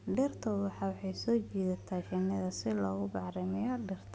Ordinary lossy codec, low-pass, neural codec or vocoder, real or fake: none; none; none; real